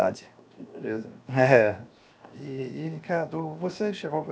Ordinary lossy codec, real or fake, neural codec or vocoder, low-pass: none; fake; codec, 16 kHz, 0.7 kbps, FocalCodec; none